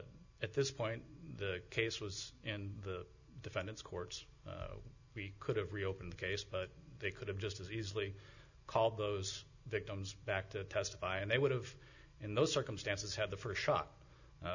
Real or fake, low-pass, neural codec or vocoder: real; 7.2 kHz; none